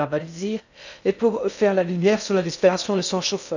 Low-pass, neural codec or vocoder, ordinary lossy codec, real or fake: 7.2 kHz; codec, 16 kHz in and 24 kHz out, 0.6 kbps, FocalCodec, streaming, 2048 codes; none; fake